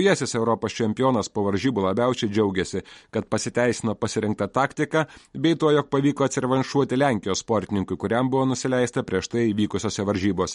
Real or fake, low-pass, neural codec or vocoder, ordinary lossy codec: real; 19.8 kHz; none; MP3, 48 kbps